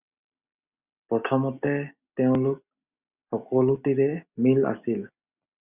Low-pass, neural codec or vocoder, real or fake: 3.6 kHz; none; real